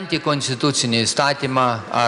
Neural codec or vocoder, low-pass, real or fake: none; 10.8 kHz; real